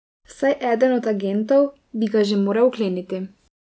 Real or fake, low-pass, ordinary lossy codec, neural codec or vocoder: real; none; none; none